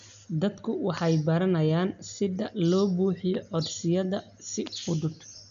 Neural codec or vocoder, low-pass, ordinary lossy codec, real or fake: none; 7.2 kHz; MP3, 96 kbps; real